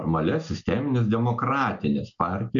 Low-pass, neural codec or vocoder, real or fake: 7.2 kHz; none; real